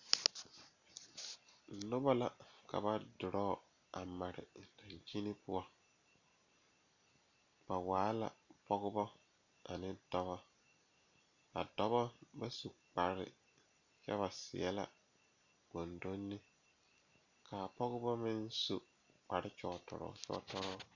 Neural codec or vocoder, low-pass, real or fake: none; 7.2 kHz; real